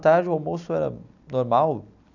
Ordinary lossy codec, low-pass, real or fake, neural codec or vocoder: none; 7.2 kHz; real; none